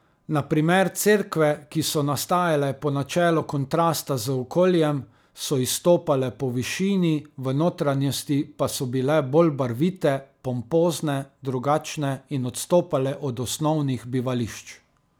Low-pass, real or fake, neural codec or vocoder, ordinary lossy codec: none; real; none; none